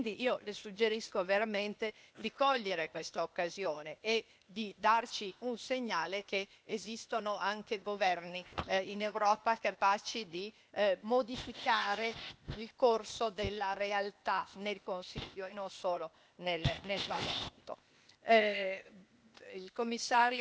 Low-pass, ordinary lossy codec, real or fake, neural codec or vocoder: none; none; fake; codec, 16 kHz, 0.8 kbps, ZipCodec